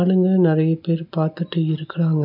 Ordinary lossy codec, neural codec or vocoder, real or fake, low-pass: none; none; real; 5.4 kHz